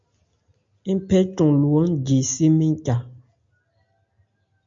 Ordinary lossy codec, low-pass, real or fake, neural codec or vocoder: MP3, 48 kbps; 7.2 kHz; real; none